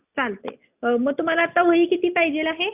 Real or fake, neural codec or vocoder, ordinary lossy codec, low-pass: real; none; none; 3.6 kHz